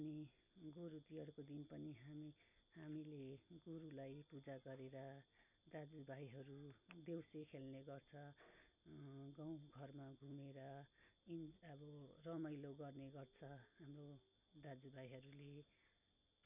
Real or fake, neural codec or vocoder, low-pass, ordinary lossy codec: real; none; 3.6 kHz; none